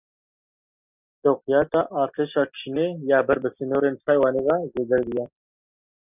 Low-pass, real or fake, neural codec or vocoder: 3.6 kHz; real; none